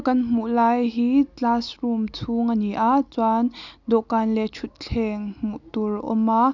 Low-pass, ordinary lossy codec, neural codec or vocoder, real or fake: 7.2 kHz; none; none; real